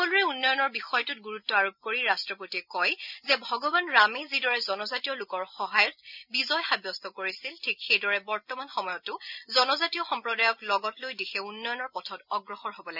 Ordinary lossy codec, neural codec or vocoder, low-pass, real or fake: none; none; 5.4 kHz; real